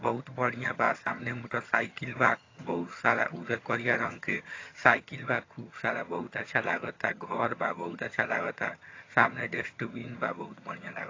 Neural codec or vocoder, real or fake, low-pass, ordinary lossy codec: vocoder, 22.05 kHz, 80 mel bands, HiFi-GAN; fake; 7.2 kHz; AAC, 32 kbps